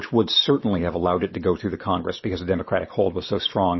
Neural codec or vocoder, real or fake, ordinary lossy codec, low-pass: none; real; MP3, 24 kbps; 7.2 kHz